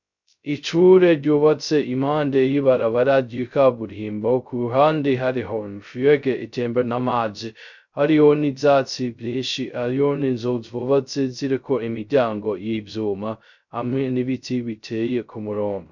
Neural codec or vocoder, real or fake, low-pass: codec, 16 kHz, 0.2 kbps, FocalCodec; fake; 7.2 kHz